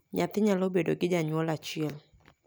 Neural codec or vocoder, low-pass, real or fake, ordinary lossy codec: none; none; real; none